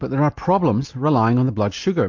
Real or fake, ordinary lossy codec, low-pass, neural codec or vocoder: real; MP3, 48 kbps; 7.2 kHz; none